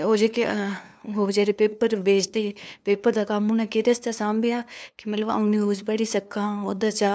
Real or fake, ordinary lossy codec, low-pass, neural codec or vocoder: fake; none; none; codec, 16 kHz, 2 kbps, FunCodec, trained on LibriTTS, 25 frames a second